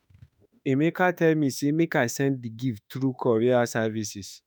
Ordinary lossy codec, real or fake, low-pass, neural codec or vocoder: none; fake; none; autoencoder, 48 kHz, 32 numbers a frame, DAC-VAE, trained on Japanese speech